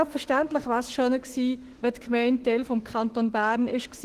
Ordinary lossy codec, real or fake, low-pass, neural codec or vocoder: Opus, 16 kbps; fake; 14.4 kHz; autoencoder, 48 kHz, 32 numbers a frame, DAC-VAE, trained on Japanese speech